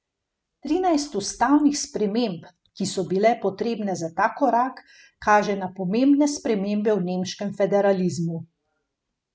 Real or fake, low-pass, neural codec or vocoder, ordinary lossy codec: real; none; none; none